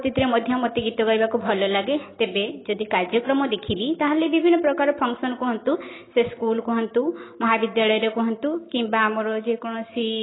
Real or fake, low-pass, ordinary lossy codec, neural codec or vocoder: real; 7.2 kHz; AAC, 16 kbps; none